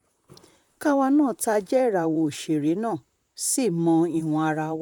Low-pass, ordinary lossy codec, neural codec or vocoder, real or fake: 19.8 kHz; none; vocoder, 44.1 kHz, 128 mel bands, Pupu-Vocoder; fake